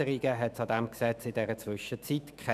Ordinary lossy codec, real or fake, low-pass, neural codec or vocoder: none; fake; 14.4 kHz; autoencoder, 48 kHz, 128 numbers a frame, DAC-VAE, trained on Japanese speech